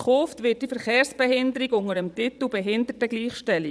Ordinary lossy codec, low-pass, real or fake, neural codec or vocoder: none; none; fake; vocoder, 22.05 kHz, 80 mel bands, Vocos